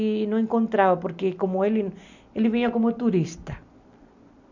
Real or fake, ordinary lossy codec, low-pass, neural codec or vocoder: real; none; 7.2 kHz; none